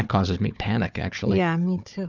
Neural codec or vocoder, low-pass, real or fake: codec, 16 kHz, 4 kbps, FunCodec, trained on Chinese and English, 50 frames a second; 7.2 kHz; fake